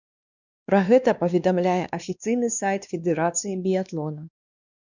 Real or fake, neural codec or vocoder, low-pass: fake; codec, 16 kHz, 2 kbps, X-Codec, WavLM features, trained on Multilingual LibriSpeech; 7.2 kHz